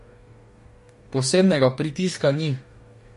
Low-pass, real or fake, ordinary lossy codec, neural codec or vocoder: 14.4 kHz; fake; MP3, 48 kbps; codec, 44.1 kHz, 2.6 kbps, DAC